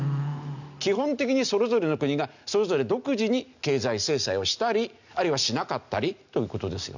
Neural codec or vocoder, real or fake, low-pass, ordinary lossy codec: none; real; 7.2 kHz; none